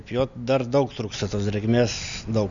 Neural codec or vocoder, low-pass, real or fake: none; 7.2 kHz; real